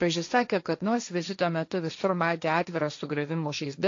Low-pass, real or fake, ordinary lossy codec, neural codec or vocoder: 7.2 kHz; fake; AAC, 48 kbps; codec, 16 kHz, 1.1 kbps, Voila-Tokenizer